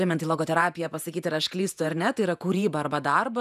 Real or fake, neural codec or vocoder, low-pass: fake; vocoder, 48 kHz, 128 mel bands, Vocos; 14.4 kHz